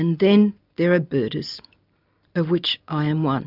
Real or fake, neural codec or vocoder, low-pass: real; none; 5.4 kHz